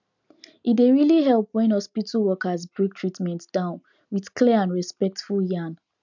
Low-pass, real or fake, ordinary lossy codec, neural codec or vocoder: 7.2 kHz; real; none; none